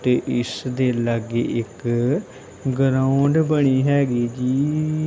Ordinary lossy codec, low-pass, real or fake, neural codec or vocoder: none; none; real; none